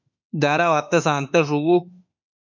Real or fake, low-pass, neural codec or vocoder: fake; 7.2 kHz; autoencoder, 48 kHz, 32 numbers a frame, DAC-VAE, trained on Japanese speech